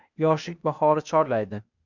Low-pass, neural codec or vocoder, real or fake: 7.2 kHz; codec, 16 kHz, 0.8 kbps, ZipCodec; fake